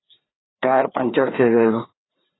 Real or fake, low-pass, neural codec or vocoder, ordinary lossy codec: fake; 7.2 kHz; codec, 16 kHz, 2 kbps, FreqCodec, larger model; AAC, 16 kbps